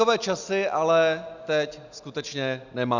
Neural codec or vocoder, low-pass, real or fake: none; 7.2 kHz; real